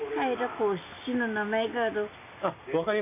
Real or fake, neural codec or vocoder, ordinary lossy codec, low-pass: real; none; none; 3.6 kHz